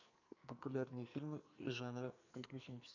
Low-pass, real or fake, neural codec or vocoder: 7.2 kHz; fake; codec, 44.1 kHz, 2.6 kbps, SNAC